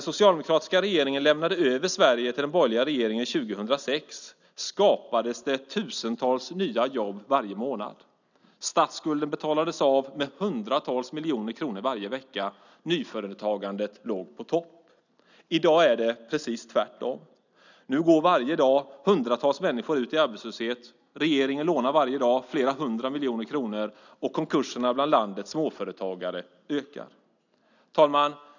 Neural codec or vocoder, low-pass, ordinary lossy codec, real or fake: none; 7.2 kHz; none; real